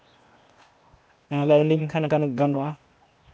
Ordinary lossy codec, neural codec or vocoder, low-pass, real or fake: none; codec, 16 kHz, 0.8 kbps, ZipCodec; none; fake